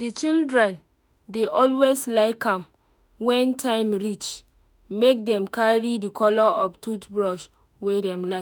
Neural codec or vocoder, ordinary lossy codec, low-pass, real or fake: autoencoder, 48 kHz, 32 numbers a frame, DAC-VAE, trained on Japanese speech; none; none; fake